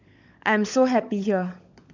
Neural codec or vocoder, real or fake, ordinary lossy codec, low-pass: codec, 16 kHz, 16 kbps, FunCodec, trained on LibriTTS, 50 frames a second; fake; MP3, 64 kbps; 7.2 kHz